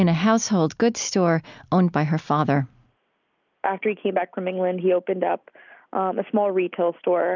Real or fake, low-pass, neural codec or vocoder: real; 7.2 kHz; none